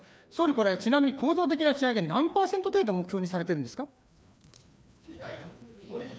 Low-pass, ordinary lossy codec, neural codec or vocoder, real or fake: none; none; codec, 16 kHz, 2 kbps, FreqCodec, larger model; fake